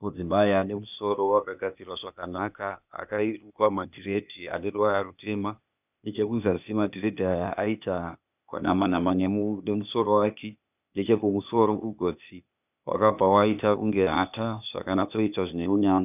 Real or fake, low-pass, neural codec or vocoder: fake; 3.6 kHz; codec, 16 kHz, 0.8 kbps, ZipCodec